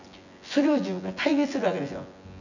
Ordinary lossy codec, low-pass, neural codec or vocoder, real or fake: none; 7.2 kHz; vocoder, 24 kHz, 100 mel bands, Vocos; fake